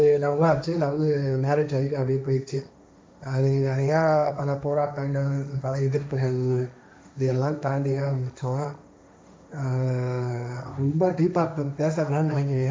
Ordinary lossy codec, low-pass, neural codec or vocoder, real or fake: none; none; codec, 16 kHz, 1.1 kbps, Voila-Tokenizer; fake